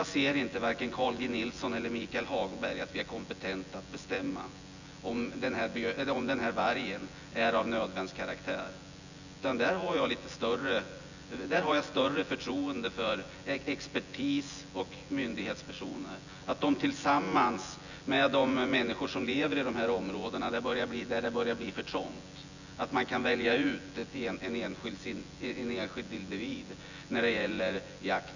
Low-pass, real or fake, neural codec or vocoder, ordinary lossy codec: 7.2 kHz; fake; vocoder, 24 kHz, 100 mel bands, Vocos; none